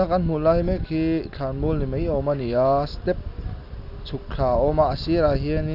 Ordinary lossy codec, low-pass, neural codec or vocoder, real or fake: none; 5.4 kHz; none; real